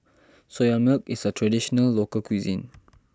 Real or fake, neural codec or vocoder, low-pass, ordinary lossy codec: real; none; none; none